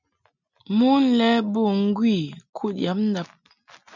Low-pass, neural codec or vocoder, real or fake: 7.2 kHz; none; real